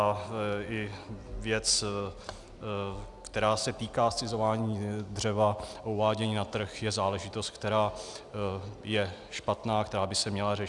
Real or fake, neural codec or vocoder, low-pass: real; none; 10.8 kHz